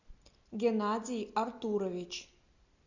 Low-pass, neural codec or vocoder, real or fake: 7.2 kHz; none; real